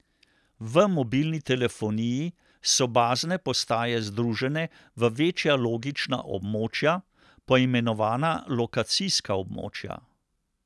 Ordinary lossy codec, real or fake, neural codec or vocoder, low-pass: none; real; none; none